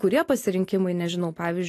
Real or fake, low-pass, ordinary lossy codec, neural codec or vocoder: real; 14.4 kHz; AAC, 48 kbps; none